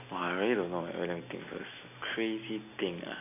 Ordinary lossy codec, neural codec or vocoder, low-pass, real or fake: AAC, 24 kbps; none; 3.6 kHz; real